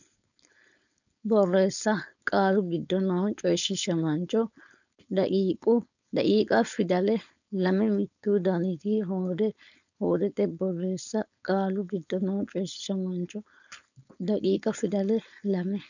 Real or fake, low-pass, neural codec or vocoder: fake; 7.2 kHz; codec, 16 kHz, 4.8 kbps, FACodec